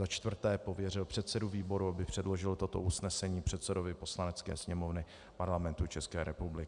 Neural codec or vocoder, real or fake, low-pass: none; real; 10.8 kHz